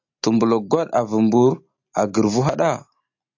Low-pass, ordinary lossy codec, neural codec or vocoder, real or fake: 7.2 kHz; AAC, 48 kbps; none; real